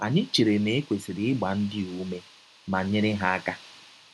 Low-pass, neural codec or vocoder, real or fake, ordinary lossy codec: none; none; real; none